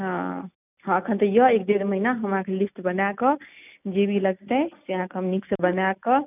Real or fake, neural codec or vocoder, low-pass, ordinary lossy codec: real; none; 3.6 kHz; none